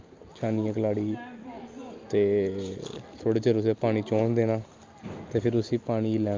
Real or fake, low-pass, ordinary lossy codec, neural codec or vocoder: real; 7.2 kHz; Opus, 24 kbps; none